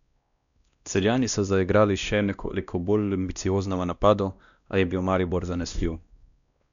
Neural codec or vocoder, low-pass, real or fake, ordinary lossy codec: codec, 16 kHz, 1 kbps, X-Codec, WavLM features, trained on Multilingual LibriSpeech; 7.2 kHz; fake; none